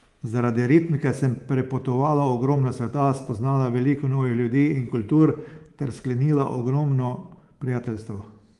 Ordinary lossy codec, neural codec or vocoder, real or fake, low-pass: Opus, 24 kbps; codec, 24 kHz, 3.1 kbps, DualCodec; fake; 10.8 kHz